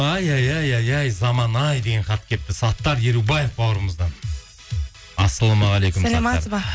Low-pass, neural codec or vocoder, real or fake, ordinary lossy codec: none; none; real; none